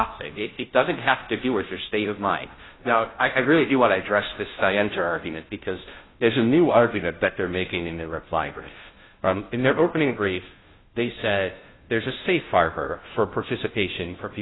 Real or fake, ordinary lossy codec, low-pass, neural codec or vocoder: fake; AAC, 16 kbps; 7.2 kHz; codec, 16 kHz, 0.5 kbps, FunCodec, trained on Chinese and English, 25 frames a second